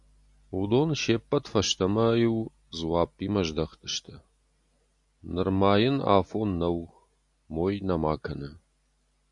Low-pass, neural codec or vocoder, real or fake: 10.8 kHz; none; real